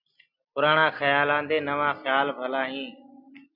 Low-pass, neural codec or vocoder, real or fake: 5.4 kHz; none; real